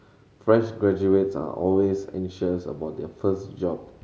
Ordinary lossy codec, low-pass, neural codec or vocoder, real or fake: none; none; none; real